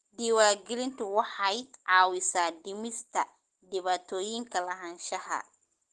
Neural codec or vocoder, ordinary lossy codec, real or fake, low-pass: none; Opus, 16 kbps; real; 10.8 kHz